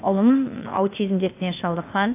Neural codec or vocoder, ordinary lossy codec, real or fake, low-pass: codec, 16 kHz, 0.8 kbps, ZipCodec; AAC, 24 kbps; fake; 3.6 kHz